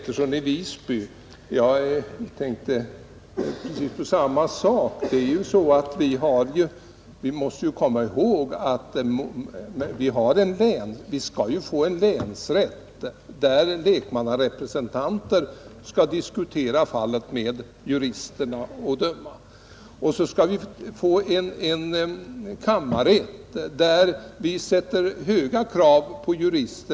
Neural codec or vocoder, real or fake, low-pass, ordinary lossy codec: none; real; none; none